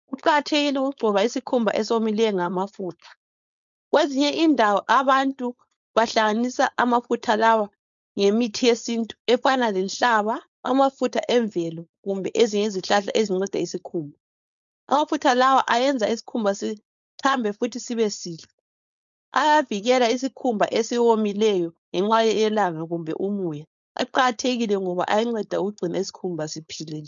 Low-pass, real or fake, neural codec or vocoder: 7.2 kHz; fake; codec, 16 kHz, 4.8 kbps, FACodec